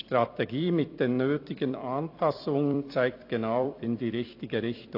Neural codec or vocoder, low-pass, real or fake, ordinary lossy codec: vocoder, 44.1 kHz, 128 mel bands every 256 samples, BigVGAN v2; 5.4 kHz; fake; AAC, 32 kbps